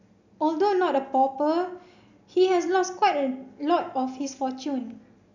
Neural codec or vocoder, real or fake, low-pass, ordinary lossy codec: none; real; 7.2 kHz; none